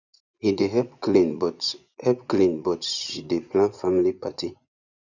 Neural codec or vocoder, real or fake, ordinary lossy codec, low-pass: vocoder, 24 kHz, 100 mel bands, Vocos; fake; none; 7.2 kHz